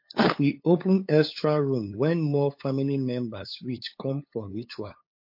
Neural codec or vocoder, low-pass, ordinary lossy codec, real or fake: codec, 16 kHz, 8 kbps, FunCodec, trained on LibriTTS, 25 frames a second; 5.4 kHz; MP3, 32 kbps; fake